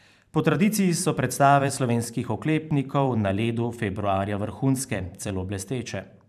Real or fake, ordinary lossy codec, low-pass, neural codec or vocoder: fake; none; 14.4 kHz; vocoder, 44.1 kHz, 128 mel bands every 512 samples, BigVGAN v2